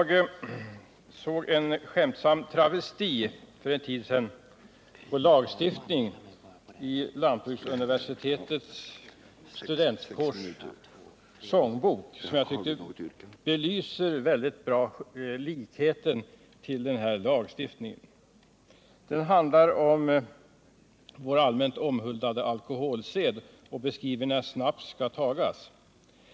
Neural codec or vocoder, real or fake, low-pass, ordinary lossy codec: none; real; none; none